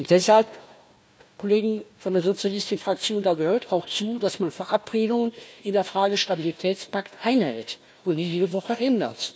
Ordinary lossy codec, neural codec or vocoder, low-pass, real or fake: none; codec, 16 kHz, 1 kbps, FunCodec, trained on Chinese and English, 50 frames a second; none; fake